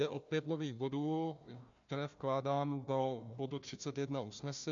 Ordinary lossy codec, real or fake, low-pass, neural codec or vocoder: MP3, 64 kbps; fake; 7.2 kHz; codec, 16 kHz, 1 kbps, FunCodec, trained on Chinese and English, 50 frames a second